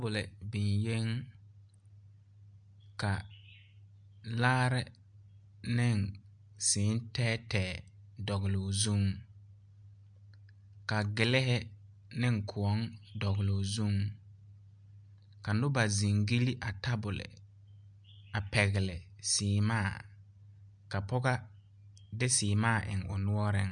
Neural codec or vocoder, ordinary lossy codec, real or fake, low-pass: none; MP3, 64 kbps; real; 9.9 kHz